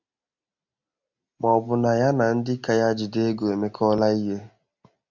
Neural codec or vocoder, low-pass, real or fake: none; 7.2 kHz; real